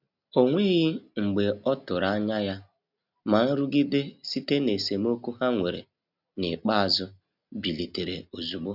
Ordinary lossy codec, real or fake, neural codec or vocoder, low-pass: none; real; none; 5.4 kHz